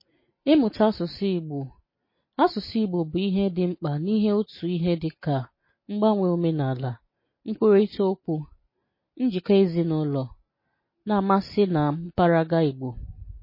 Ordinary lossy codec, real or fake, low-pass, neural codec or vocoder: MP3, 24 kbps; real; 5.4 kHz; none